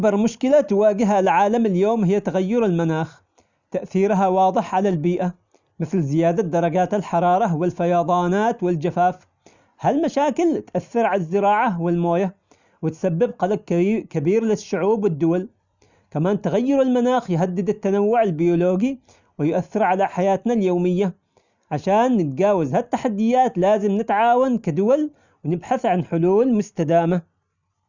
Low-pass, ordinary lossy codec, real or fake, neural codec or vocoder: 7.2 kHz; none; real; none